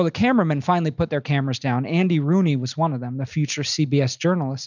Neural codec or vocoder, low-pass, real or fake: none; 7.2 kHz; real